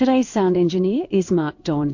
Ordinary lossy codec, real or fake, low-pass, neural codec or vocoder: AAC, 48 kbps; fake; 7.2 kHz; codec, 16 kHz in and 24 kHz out, 1 kbps, XY-Tokenizer